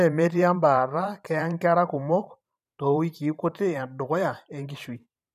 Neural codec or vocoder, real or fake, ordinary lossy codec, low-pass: vocoder, 44.1 kHz, 128 mel bands every 512 samples, BigVGAN v2; fake; none; 14.4 kHz